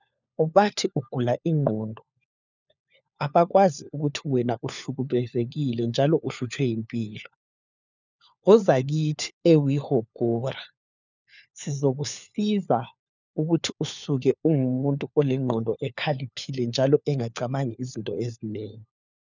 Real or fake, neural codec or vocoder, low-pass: fake; codec, 16 kHz, 4 kbps, FunCodec, trained on LibriTTS, 50 frames a second; 7.2 kHz